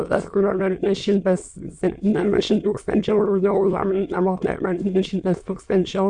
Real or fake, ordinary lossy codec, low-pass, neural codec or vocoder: fake; Opus, 64 kbps; 9.9 kHz; autoencoder, 22.05 kHz, a latent of 192 numbers a frame, VITS, trained on many speakers